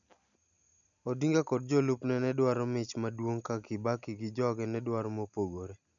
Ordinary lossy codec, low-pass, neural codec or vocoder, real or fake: none; 7.2 kHz; none; real